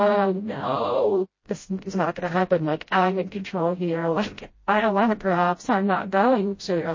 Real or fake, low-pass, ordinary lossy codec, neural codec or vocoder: fake; 7.2 kHz; MP3, 32 kbps; codec, 16 kHz, 0.5 kbps, FreqCodec, smaller model